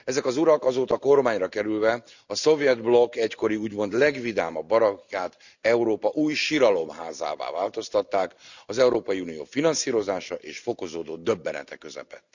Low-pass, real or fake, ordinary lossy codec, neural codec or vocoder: 7.2 kHz; real; none; none